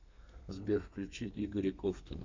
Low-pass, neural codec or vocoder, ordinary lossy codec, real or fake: 7.2 kHz; codec, 32 kHz, 1.9 kbps, SNAC; MP3, 64 kbps; fake